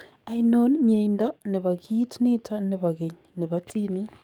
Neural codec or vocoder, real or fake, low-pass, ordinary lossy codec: codec, 44.1 kHz, 7.8 kbps, DAC; fake; 19.8 kHz; none